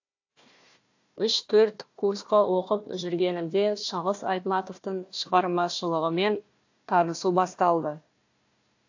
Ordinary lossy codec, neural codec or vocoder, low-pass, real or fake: AAC, 48 kbps; codec, 16 kHz, 1 kbps, FunCodec, trained on Chinese and English, 50 frames a second; 7.2 kHz; fake